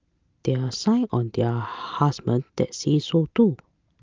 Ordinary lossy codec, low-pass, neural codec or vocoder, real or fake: Opus, 24 kbps; 7.2 kHz; none; real